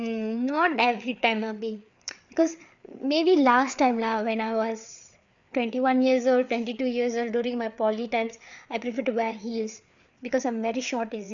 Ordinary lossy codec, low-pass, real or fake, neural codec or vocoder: none; 7.2 kHz; fake; codec, 16 kHz, 4 kbps, FreqCodec, larger model